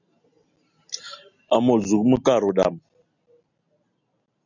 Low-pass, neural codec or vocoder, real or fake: 7.2 kHz; none; real